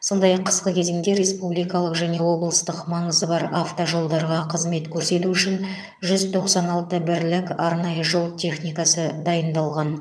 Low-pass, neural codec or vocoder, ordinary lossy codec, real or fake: none; vocoder, 22.05 kHz, 80 mel bands, HiFi-GAN; none; fake